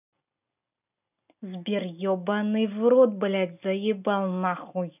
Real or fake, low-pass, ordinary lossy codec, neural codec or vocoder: real; 3.6 kHz; none; none